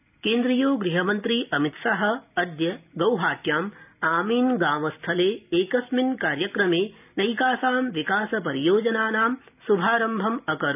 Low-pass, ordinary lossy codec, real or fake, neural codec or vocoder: 3.6 kHz; none; real; none